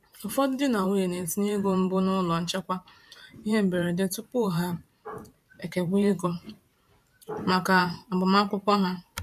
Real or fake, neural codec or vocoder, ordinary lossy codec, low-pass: fake; vocoder, 44.1 kHz, 128 mel bands every 512 samples, BigVGAN v2; MP3, 96 kbps; 14.4 kHz